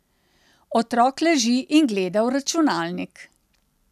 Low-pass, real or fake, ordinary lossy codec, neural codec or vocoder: 14.4 kHz; real; none; none